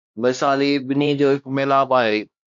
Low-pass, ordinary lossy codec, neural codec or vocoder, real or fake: 7.2 kHz; MP3, 64 kbps; codec, 16 kHz, 1 kbps, X-Codec, HuBERT features, trained on LibriSpeech; fake